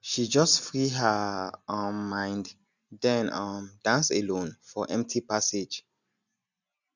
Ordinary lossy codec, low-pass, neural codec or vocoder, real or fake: none; 7.2 kHz; none; real